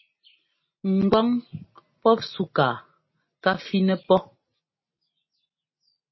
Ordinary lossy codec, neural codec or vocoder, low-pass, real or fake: MP3, 24 kbps; none; 7.2 kHz; real